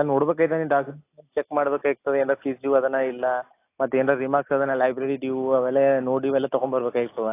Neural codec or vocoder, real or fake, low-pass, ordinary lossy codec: none; real; 3.6 kHz; AAC, 24 kbps